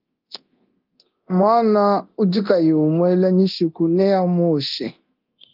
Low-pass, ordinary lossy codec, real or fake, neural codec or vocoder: 5.4 kHz; Opus, 24 kbps; fake; codec, 24 kHz, 0.9 kbps, DualCodec